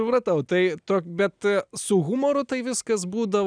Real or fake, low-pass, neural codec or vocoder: real; 9.9 kHz; none